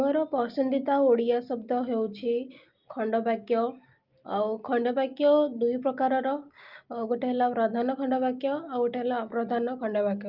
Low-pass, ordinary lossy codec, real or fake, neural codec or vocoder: 5.4 kHz; Opus, 32 kbps; real; none